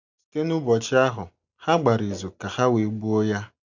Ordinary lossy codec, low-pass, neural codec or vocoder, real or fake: none; 7.2 kHz; none; real